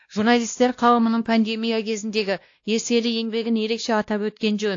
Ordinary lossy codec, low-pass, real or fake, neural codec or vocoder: AAC, 48 kbps; 7.2 kHz; fake; codec, 16 kHz, 1 kbps, X-Codec, WavLM features, trained on Multilingual LibriSpeech